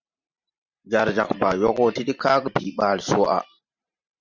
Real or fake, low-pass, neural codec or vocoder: fake; 7.2 kHz; vocoder, 44.1 kHz, 128 mel bands every 512 samples, BigVGAN v2